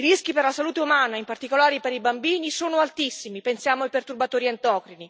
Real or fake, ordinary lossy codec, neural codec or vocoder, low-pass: real; none; none; none